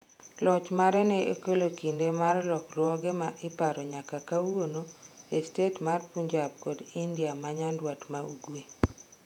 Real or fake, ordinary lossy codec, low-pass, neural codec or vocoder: fake; none; 19.8 kHz; vocoder, 48 kHz, 128 mel bands, Vocos